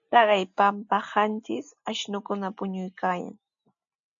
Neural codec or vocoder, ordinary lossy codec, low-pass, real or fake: none; AAC, 64 kbps; 7.2 kHz; real